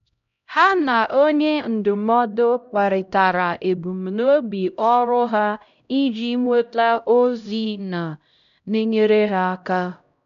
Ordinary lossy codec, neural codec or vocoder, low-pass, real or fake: none; codec, 16 kHz, 0.5 kbps, X-Codec, HuBERT features, trained on LibriSpeech; 7.2 kHz; fake